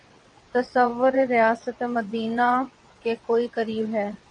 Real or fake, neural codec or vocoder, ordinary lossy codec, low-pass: fake; vocoder, 22.05 kHz, 80 mel bands, WaveNeXt; AAC, 64 kbps; 9.9 kHz